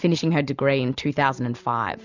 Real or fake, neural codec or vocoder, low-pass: real; none; 7.2 kHz